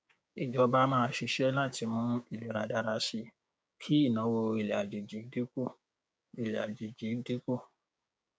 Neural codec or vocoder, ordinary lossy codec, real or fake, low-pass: codec, 16 kHz, 6 kbps, DAC; none; fake; none